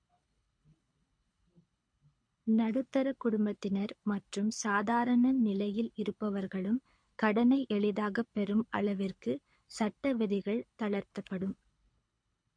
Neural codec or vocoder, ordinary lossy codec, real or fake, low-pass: codec, 24 kHz, 6 kbps, HILCodec; MP3, 48 kbps; fake; 9.9 kHz